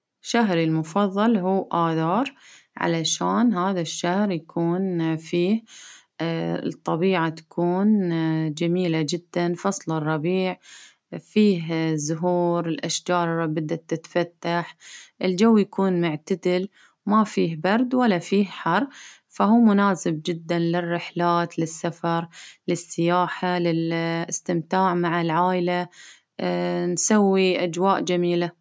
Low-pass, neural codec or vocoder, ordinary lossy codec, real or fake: none; none; none; real